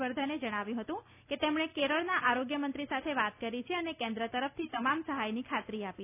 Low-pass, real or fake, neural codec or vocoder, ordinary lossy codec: 3.6 kHz; real; none; none